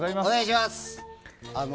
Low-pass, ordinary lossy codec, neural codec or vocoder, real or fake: none; none; none; real